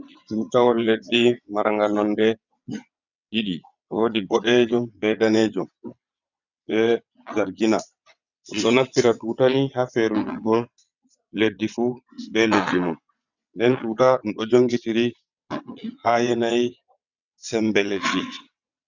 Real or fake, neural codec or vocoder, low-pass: fake; vocoder, 22.05 kHz, 80 mel bands, WaveNeXt; 7.2 kHz